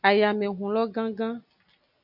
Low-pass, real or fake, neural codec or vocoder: 5.4 kHz; real; none